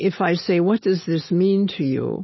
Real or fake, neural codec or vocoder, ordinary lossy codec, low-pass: real; none; MP3, 24 kbps; 7.2 kHz